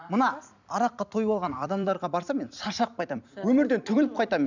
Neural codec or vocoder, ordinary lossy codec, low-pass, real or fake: vocoder, 44.1 kHz, 128 mel bands every 256 samples, BigVGAN v2; none; 7.2 kHz; fake